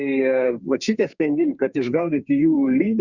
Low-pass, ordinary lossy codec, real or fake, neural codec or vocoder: 7.2 kHz; Opus, 64 kbps; fake; codec, 32 kHz, 1.9 kbps, SNAC